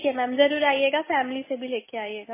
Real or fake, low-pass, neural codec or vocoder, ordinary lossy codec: real; 3.6 kHz; none; MP3, 16 kbps